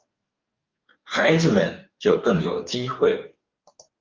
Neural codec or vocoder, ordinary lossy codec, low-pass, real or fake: codec, 44.1 kHz, 2.6 kbps, DAC; Opus, 24 kbps; 7.2 kHz; fake